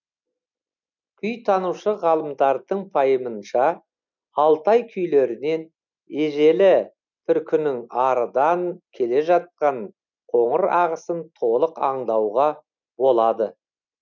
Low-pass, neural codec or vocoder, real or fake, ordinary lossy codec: 7.2 kHz; none; real; none